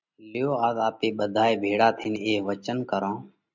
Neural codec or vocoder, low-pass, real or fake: none; 7.2 kHz; real